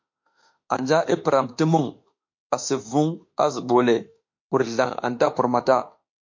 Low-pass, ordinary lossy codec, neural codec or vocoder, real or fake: 7.2 kHz; MP3, 48 kbps; autoencoder, 48 kHz, 32 numbers a frame, DAC-VAE, trained on Japanese speech; fake